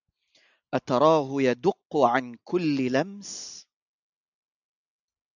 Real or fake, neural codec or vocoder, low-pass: real; none; 7.2 kHz